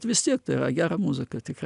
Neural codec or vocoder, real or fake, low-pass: none; real; 10.8 kHz